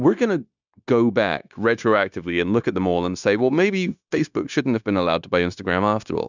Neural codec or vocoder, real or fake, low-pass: codec, 16 kHz, 0.9 kbps, LongCat-Audio-Codec; fake; 7.2 kHz